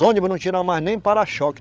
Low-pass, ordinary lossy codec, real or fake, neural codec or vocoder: none; none; fake; codec, 16 kHz, 8 kbps, FreqCodec, larger model